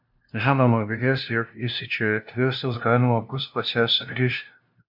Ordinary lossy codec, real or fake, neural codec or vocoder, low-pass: AAC, 48 kbps; fake; codec, 16 kHz, 0.5 kbps, FunCodec, trained on LibriTTS, 25 frames a second; 5.4 kHz